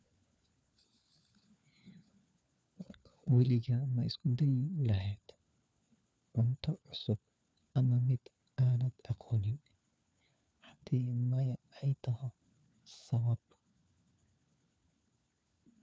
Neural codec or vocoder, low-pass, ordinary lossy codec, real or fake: codec, 16 kHz, 4 kbps, FunCodec, trained on LibriTTS, 50 frames a second; none; none; fake